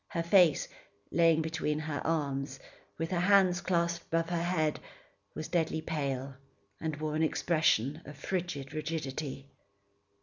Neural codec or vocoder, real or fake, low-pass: none; real; 7.2 kHz